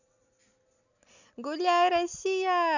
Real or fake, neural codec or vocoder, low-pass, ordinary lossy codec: real; none; 7.2 kHz; none